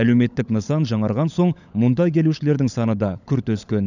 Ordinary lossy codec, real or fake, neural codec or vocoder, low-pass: none; fake; codec, 16 kHz, 16 kbps, FunCodec, trained on LibriTTS, 50 frames a second; 7.2 kHz